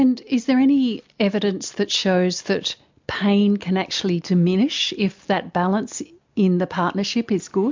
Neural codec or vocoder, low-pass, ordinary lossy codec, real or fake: none; 7.2 kHz; MP3, 64 kbps; real